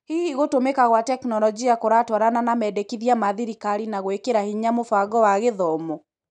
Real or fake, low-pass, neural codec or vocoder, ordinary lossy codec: real; 10.8 kHz; none; none